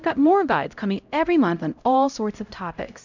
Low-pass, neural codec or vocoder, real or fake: 7.2 kHz; codec, 16 kHz, 0.5 kbps, X-Codec, HuBERT features, trained on LibriSpeech; fake